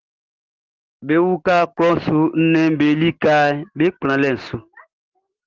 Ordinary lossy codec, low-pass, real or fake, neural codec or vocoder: Opus, 16 kbps; 7.2 kHz; real; none